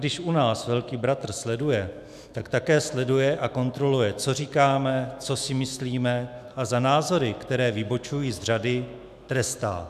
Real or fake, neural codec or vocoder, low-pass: fake; autoencoder, 48 kHz, 128 numbers a frame, DAC-VAE, trained on Japanese speech; 14.4 kHz